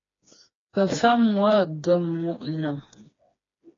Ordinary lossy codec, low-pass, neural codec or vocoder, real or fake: AAC, 32 kbps; 7.2 kHz; codec, 16 kHz, 2 kbps, FreqCodec, smaller model; fake